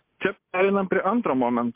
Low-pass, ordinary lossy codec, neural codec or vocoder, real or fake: 3.6 kHz; MP3, 32 kbps; none; real